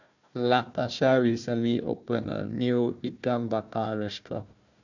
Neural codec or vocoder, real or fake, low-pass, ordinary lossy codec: codec, 16 kHz, 1 kbps, FunCodec, trained on Chinese and English, 50 frames a second; fake; 7.2 kHz; none